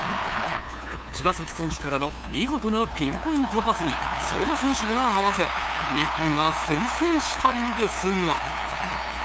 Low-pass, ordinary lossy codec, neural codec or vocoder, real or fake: none; none; codec, 16 kHz, 2 kbps, FunCodec, trained on LibriTTS, 25 frames a second; fake